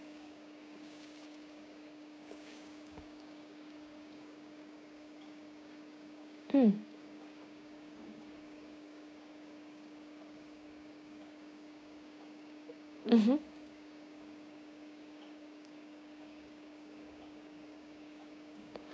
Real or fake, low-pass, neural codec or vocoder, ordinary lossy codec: fake; none; codec, 16 kHz, 6 kbps, DAC; none